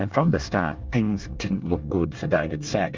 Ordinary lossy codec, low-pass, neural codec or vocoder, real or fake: Opus, 32 kbps; 7.2 kHz; codec, 24 kHz, 1 kbps, SNAC; fake